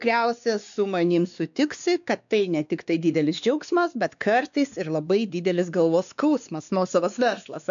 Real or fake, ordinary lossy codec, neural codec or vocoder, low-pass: fake; MP3, 96 kbps; codec, 16 kHz, 2 kbps, X-Codec, WavLM features, trained on Multilingual LibriSpeech; 7.2 kHz